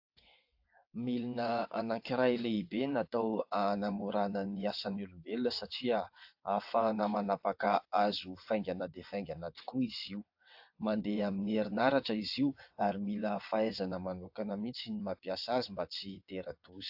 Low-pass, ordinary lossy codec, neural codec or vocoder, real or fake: 5.4 kHz; AAC, 48 kbps; vocoder, 22.05 kHz, 80 mel bands, WaveNeXt; fake